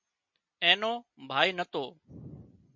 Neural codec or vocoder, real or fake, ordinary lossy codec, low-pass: none; real; MP3, 48 kbps; 7.2 kHz